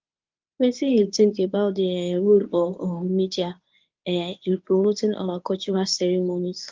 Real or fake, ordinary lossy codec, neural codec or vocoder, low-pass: fake; Opus, 24 kbps; codec, 24 kHz, 0.9 kbps, WavTokenizer, medium speech release version 1; 7.2 kHz